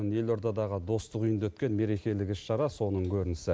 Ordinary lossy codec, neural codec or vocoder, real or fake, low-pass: none; none; real; none